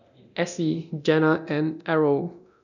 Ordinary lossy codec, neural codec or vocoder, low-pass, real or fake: none; codec, 24 kHz, 0.9 kbps, DualCodec; 7.2 kHz; fake